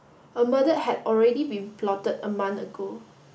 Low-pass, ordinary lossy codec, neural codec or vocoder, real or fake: none; none; none; real